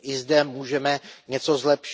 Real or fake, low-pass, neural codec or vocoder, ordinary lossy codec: real; none; none; none